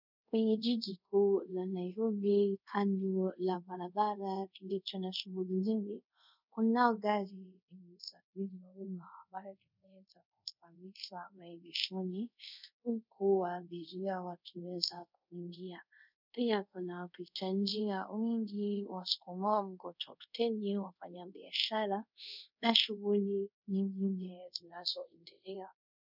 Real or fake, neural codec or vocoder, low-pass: fake; codec, 24 kHz, 0.5 kbps, DualCodec; 5.4 kHz